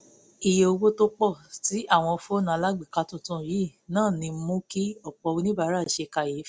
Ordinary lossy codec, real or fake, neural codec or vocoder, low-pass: none; real; none; none